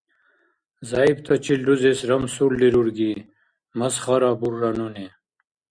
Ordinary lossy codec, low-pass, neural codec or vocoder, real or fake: Opus, 64 kbps; 9.9 kHz; none; real